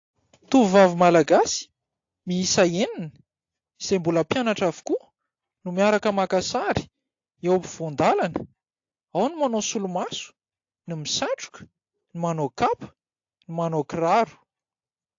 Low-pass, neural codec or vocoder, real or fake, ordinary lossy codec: 7.2 kHz; none; real; AAC, 48 kbps